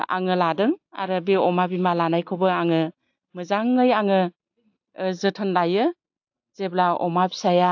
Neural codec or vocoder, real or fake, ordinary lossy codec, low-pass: none; real; AAC, 48 kbps; 7.2 kHz